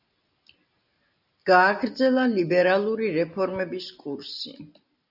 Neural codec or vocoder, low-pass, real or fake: none; 5.4 kHz; real